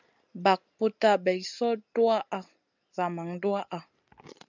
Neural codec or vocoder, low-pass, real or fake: none; 7.2 kHz; real